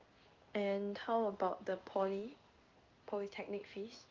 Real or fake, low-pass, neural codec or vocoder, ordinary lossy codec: fake; 7.2 kHz; codec, 16 kHz in and 24 kHz out, 1 kbps, XY-Tokenizer; Opus, 32 kbps